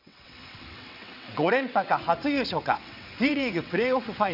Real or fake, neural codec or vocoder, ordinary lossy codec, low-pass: fake; vocoder, 22.05 kHz, 80 mel bands, WaveNeXt; none; 5.4 kHz